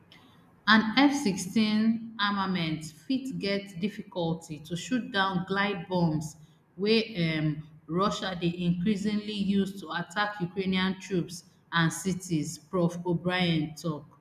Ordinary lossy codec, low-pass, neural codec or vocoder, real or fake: none; 14.4 kHz; none; real